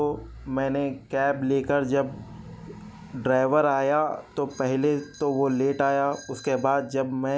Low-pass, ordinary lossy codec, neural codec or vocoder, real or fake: none; none; none; real